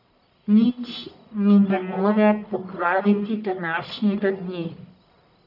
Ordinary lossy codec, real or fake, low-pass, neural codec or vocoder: none; fake; 5.4 kHz; codec, 44.1 kHz, 1.7 kbps, Pupu-Codec